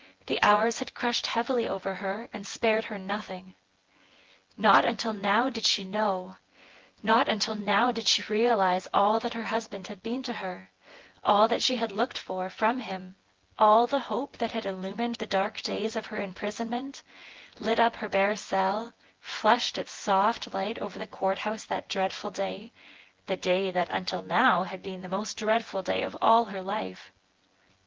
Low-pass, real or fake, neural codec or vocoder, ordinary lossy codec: 7.2 kHz; fake; vocoder, 24 kHz, 100 mel bands, Vocos; Opus, 16 kbps